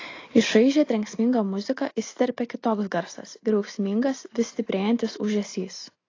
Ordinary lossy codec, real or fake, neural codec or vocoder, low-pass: AAC, 32 kbps; real; none; 7.2 kHz